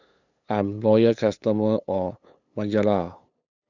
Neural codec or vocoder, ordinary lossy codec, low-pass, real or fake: codec, 16 kHz, 8 kbps, FunCodec, trained on LibriTTS, 25 frames a second; none; 7.2 kHz; fake